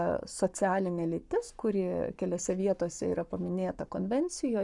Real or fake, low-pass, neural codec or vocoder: fake; 10.8 kHz; codec, 44.1 kHz, 7.8 kbps, Pupu-Codec